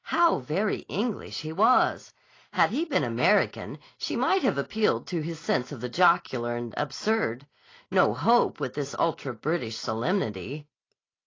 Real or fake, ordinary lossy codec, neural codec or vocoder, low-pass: real; AAC, 32 kbps; none; 7.2 kHz